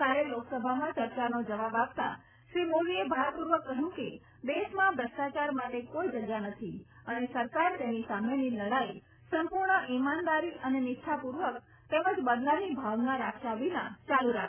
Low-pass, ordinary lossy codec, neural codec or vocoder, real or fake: 3.6 kHz; none; none; real